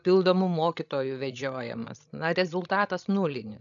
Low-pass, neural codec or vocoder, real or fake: 7.2 kHz; codec, 16 kHz, 16 kbps, FreqCodec, larger model; fake